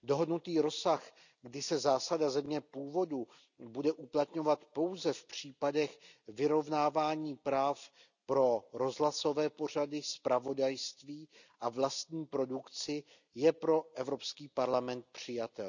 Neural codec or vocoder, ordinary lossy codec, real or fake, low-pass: none; none; real; 7.2 kHz